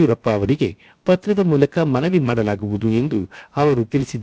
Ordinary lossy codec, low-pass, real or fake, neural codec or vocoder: none; none; fake; codec, 16 kHz, about 1 kbps, DyCAST, with the encoder's durations